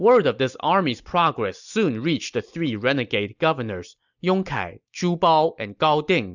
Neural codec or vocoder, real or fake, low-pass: none; real; 7.2 kHz